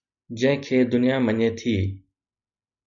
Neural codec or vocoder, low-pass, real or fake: none; 7.2 kHz; real